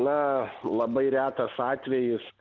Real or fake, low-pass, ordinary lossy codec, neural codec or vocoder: real; 7.2 kHz; Opus, 24 kbps; none